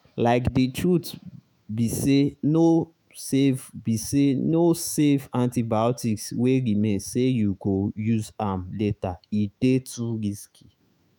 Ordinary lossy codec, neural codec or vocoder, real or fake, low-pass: none; autoencoder, 48 kHz, 128 numbers a frame, DAC-VAE, trained on Japanese speech; fake; none